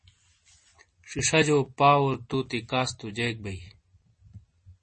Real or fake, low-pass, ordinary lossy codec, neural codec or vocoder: real; 9.9 kHz; MP3, 32 kbps; none